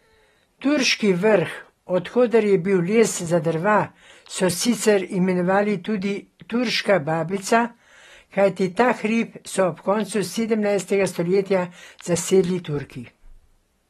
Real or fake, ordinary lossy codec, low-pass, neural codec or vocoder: real; AAC, 32 kbps; 19.8 kHz; none